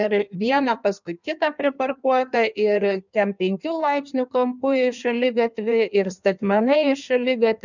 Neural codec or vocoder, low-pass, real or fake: codec, 16 kHz in and 24 kHz out, 1.1 kbps, FireRedTTS-2 codec; 7.2 kHz; fake